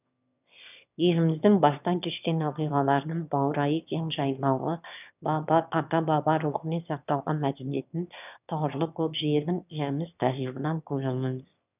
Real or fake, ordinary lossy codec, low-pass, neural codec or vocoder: fake; none; 3.6 kHz; autoencoder, 22.05 kHz, a latent of 192 numbers a frame, VITS, trained on one speaker